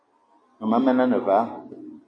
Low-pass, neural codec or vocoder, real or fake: 9.9 kHz; none; real